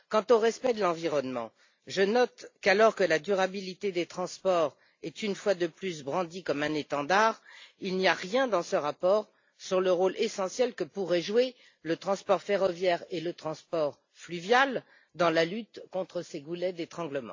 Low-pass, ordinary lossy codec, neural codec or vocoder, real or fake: 7.2 kHz; AAC, 48 kbps; none; real